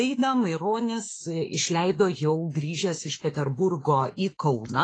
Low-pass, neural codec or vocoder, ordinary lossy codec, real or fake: 9.9 kHz; autoencoder, 48 kHz, 32 numbers a frame, DAC-VAE, trained on Japanese speech; AAC, 32 kbps; fake